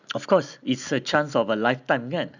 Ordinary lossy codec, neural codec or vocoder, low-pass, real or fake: none; none; 7.2 kHz; real